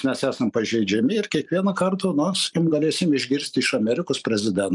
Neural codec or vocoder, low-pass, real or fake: vocoder, 44.1 kHz, 128 mel bands every 256 samples, BigVGAN v2; 10.8 kHz; fake